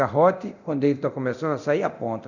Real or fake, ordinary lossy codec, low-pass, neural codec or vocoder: fake; none; 7.2 kHz; codec, 24 kHz, 0.9 kbps, DualCodec